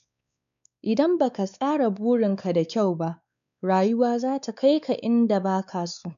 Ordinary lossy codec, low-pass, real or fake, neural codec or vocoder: none; 7.2 kHz; fake; codec, 16 kHz, 4 kbps, X-Codec, WavLM features, trained on Multilingual LibriSpeech